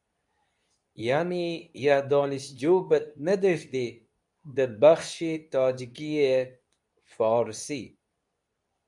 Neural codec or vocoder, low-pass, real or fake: codec, 24 kHz, 0.9 kbps, WavTokenizer, medium speech release version 2; 10.8 kHz; fake